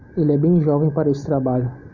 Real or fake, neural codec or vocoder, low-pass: real; none; 7.2 kHz